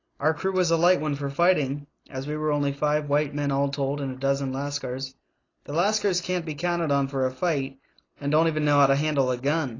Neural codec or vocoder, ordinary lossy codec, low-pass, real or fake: none; AAC, 32 kbps; 7.2 kHz; real